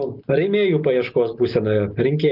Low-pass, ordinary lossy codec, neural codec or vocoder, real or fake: 5.4 kHz; Opus, 24 kbps; none; real